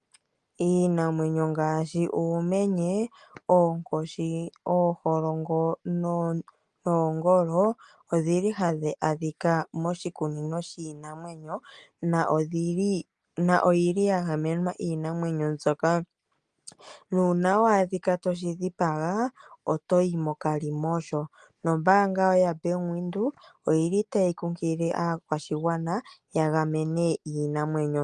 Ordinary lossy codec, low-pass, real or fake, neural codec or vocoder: Opus, 32 kbps; 10.8 kHz; real; none